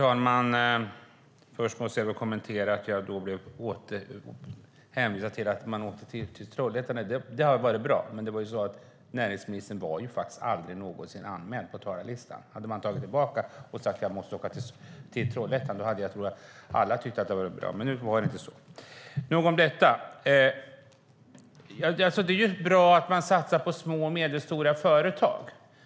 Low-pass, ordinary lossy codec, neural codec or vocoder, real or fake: none; none; none; real